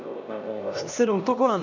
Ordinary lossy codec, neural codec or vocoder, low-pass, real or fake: none; codec, 16 kHz, 1 kbps, X-Codec, HuBERT features, trained on LibriSpeech; 7.2 kHz; fake